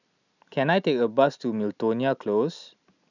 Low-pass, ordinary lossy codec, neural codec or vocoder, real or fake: 7.2 kHz; none; none; real